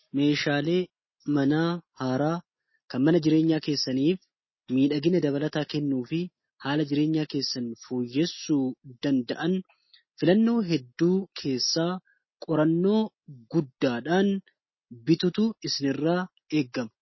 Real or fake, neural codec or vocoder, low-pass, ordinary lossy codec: real; none; 7.2 kHz; MP3, 24 kbps